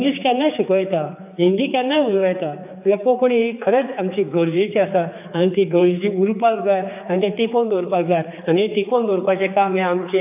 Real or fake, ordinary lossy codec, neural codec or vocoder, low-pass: fake; AAC, 32 kbps; codec, 16 kHz, 4 kbps, X-Codec, HuBERT features, trained on general audio; 3.6 kHz